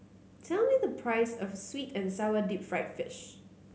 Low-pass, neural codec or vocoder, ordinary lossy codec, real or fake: none; none; none; real